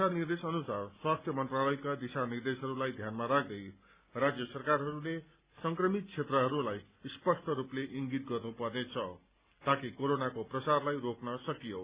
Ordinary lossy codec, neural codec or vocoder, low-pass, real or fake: Opus, 64 kbps; none; 3.6 kHz; real